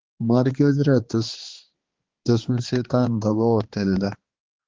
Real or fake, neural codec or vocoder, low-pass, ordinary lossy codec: fake; codec, 16 kHz, 2 kbps, X-Codec, HuBERT features, trained on balanced general audio; 7.2 kHz; Opus, 16 kbps